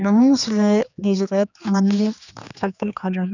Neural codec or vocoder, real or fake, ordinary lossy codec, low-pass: codec, 16 kHz, 2 kbps, X-Codec, HuBERT features, trained on general audio; fake; none; 7.2 kHz